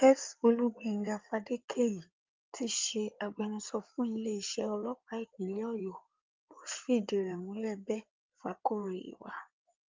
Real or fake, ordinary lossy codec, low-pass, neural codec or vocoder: fake; Opus, 24 kbps; 7.2 kHz; codec, 16 kHz in and 24 kHz out, 2.2 kbps, FireRedTTS-2 codec